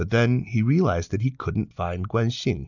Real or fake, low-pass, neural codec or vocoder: real; 7.2 kHz; none